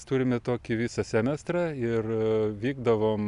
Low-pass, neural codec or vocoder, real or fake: 10.8 kHz; none; real